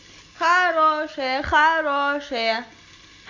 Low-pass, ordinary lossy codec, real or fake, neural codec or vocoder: 7.2 kHz; MP3, 64 kbps; real; none